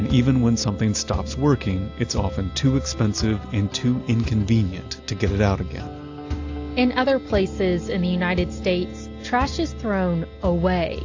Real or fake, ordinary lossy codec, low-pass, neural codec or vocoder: real; AAC, 48 kbps; 7.2 kHz; none